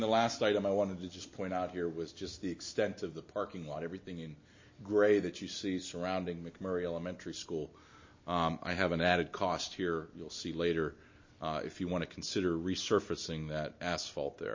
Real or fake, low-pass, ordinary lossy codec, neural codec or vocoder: real; 7.2 kHz; MP3, 32 kbps; none